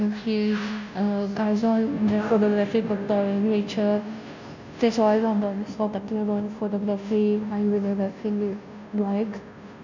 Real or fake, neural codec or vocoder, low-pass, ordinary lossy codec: fake; codec, 16 kHz, 0.5 kbps, FunCodec, trained on Chinese and English, 25 frames a second; 7.2 kHz; none